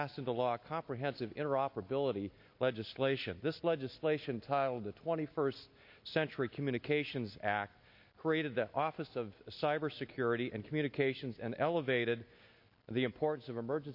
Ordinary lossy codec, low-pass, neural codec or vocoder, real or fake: MP3, 32 kbps; 5.4 kHz; none; real